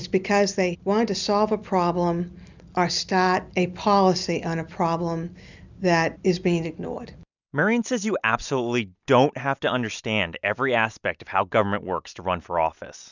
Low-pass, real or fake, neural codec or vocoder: 7.2 kHz; real; none